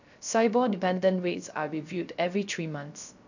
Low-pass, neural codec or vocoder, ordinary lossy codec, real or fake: 7.2 kHz; codec, 16 kHz, 0.3 kbps, FocalCodec; none; fake